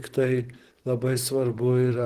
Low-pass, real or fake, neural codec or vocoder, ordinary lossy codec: 14.4 kHz; fake; vocoder, 44.1 kHz, 128 mel bands, Pupu-Vocoder; Opus, 16 kbps